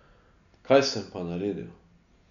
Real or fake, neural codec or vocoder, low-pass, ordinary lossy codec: real; none; 7.2 kHz; none